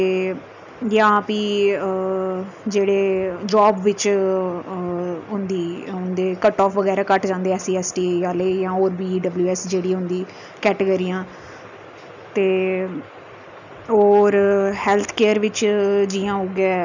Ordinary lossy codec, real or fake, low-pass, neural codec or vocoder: none; real; 7.2 kHz; none